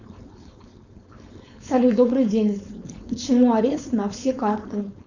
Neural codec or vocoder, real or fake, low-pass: codec, 16 kHz, 4.8 kbps, FACodec; fake; 7.2 kHz